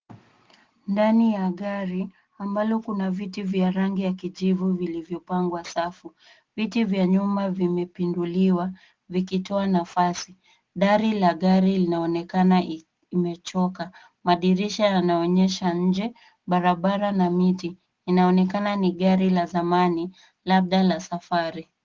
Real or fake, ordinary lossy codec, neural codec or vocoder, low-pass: real; Opus, 16 kbps; none; 7.2 kHz